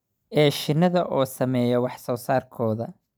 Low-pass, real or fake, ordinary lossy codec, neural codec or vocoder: none; real; none; none